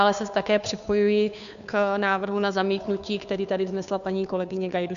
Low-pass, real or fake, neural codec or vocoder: 7.2 kHz; fake; codec, 16 kHz, 2 kbps, FunCodec, trained on Chinese and English, 25 frames a second